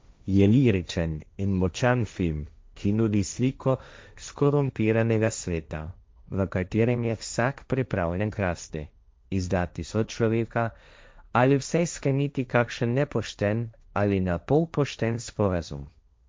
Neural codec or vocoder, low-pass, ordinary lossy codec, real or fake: codec, 16 kHz, 1.1 kbps, Voila-Tokenizer; none; none; fake